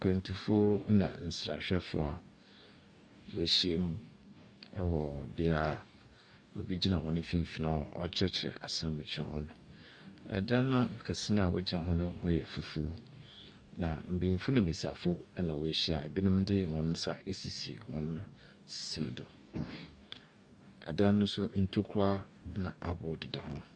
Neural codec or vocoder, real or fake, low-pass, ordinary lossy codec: codec, 44.1 kHz, 2.6 kbps, DAC; fake; 9.9 kHz; Opus, 64 kbps